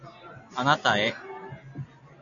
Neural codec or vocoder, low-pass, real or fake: none; 7.2 kHz; real